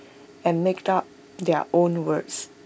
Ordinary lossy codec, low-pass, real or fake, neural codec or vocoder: none; none; real; none